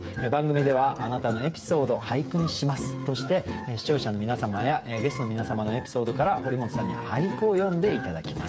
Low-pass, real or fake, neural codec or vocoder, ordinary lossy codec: none; fake; codec, 16 kHz, 8 kbps, FreqCodec, smaller model; none